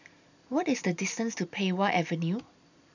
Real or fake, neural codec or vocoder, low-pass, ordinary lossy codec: fake; vocoder, 44.1 kHz, 128 mel bands every 256 samples, BigVGAN v2; 7.2 kHz; none